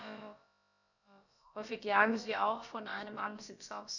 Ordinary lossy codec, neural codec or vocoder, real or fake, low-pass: none; codec, 16 kHz, about 1 kbps, DyCAST, with the encoder's durations; fake; 7.2 kHz